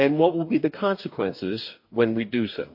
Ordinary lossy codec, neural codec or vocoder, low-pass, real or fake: MP3, 32 kbps; codec, 44.1 kHz, 2.6 kbps, DAC; 5.4 kHz; fake